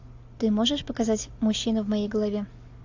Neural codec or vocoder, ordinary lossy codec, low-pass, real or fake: none; AAC, 48 kbps; 7.2 kHz; real